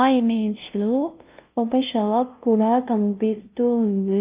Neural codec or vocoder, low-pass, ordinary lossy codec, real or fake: codec, 16 kHz, 0.5 kbps, FunCodec, trained on LibriTTS, 25 frames a second; 3.6 kHz; Opus, 24 kbps; fake